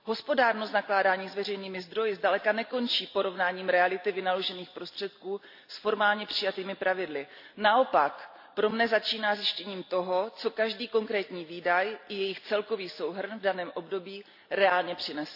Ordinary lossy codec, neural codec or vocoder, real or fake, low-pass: AAC, 48 kbps; none; real; 5.4 kHz